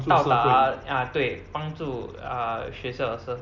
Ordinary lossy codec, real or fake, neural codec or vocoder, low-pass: Opus, 64 kbps; real; none; 7.2 kHz